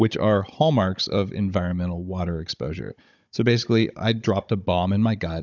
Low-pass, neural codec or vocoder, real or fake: 7.2 kHz; codec, 16 kHz, 16 kbps, FunCodec, trained on Chinese and English, 50 frames a second; fake